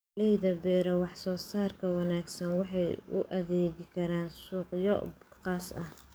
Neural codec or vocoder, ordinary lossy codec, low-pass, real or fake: codec, 44.1 kHz, 7.8 kbps, DAC; none; none; fake